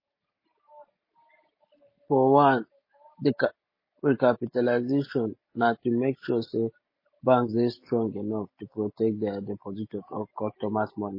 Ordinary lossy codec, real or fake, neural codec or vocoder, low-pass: MP3, 24 kbps; real; none; 5.4 kHz